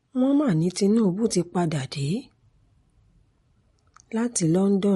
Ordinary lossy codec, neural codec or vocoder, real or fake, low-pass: MP3, 48 kbps; none; real; 19.8 kHz